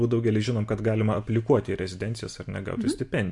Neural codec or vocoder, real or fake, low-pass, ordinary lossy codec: none; real; 10.8 kHz; MP3, 64 kbps